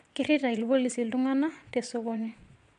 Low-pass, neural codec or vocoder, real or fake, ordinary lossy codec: 9.9 kHz; vocoder, 22.05 kHz, 80 mel bands, Vocos; fake; AAC, 96 kbps